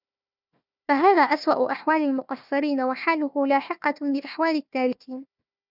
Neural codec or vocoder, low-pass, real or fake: codec, 16 kHz, 1 kbps, FunCodec, trained on Chinese and English, 50 frames a second; 5.4 kHz; fake